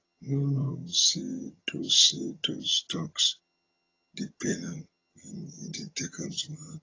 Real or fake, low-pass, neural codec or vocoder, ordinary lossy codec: fake; 7.2 kHz; vocoder, 22.05 kHz, 80 mel bands, HiFi-GAN; AAC, 32 kbps